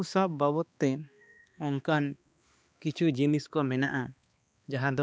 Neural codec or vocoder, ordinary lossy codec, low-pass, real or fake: codec, 16 kHz, 2 kbps, X-Codec, HuBERT features, trained on balanced general audio; none; none; fake